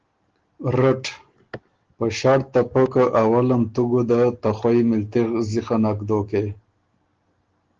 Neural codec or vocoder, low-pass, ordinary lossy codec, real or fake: none; 7.2 kHz; Opus, 16 kbps; real